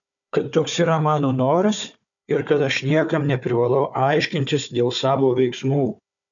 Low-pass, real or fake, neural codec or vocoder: 7.2 kHz; fake; codec, 16 kHz, 4 kbps, FunCodec, trained on Chinese and English, 50 frames a second